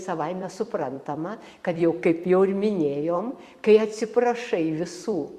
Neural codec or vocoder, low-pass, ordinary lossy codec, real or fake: vocoder, 44.1 kHz, 128 mel bands every 256 samples, BigVGAN v2; 14.4 kHz; Opus, 64 kbps; fake